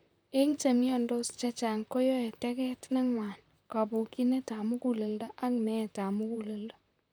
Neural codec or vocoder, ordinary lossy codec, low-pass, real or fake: vocoder, 44.1 kHz, 128 mel bands, Pupu-Vocoder; none; none; fake